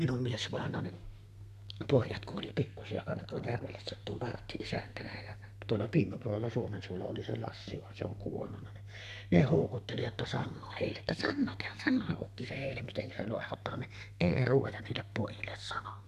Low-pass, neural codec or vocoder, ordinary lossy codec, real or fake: 14.4 kHz; codec, 32 kHz, 1.9 kbps, SNAC; none; fake